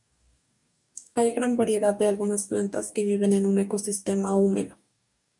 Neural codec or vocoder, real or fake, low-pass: codec, 44.1 kHz, 2.6 kbps, DAC; fake; 10.8 kHz